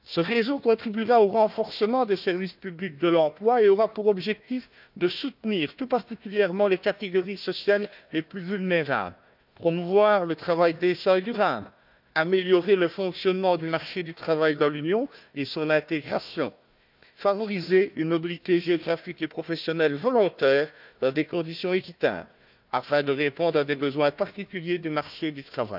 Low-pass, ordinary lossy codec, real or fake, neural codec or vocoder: 5.4 kHz; none; fake; codec, 16 kHz, 1 kbps, FunCodec, trained on Chinese and English, 50 frames a second